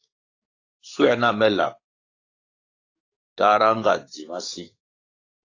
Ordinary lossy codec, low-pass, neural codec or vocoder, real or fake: AAC, 32 kbps; 7.2 kHz; codec, 44.1 kHz, 7.8 kbps, DAC; fake